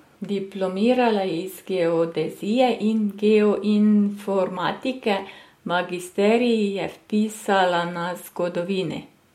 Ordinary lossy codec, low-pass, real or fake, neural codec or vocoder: MP3, 64 kbps; 19.8 kHz; real; none